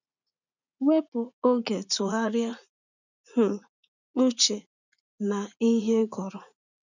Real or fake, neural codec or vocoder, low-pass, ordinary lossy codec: fake; vocoder, 44.1 kHz, 80 mel bands, Vocos; 7.2 kHz; none